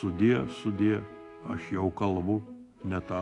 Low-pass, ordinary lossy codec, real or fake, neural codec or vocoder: 10.8 kHz; AAC, 48 kbps; fake; autoencoder, 48 kHz, 128 numbers a frame, DAC-VAE, trained on Japanese speech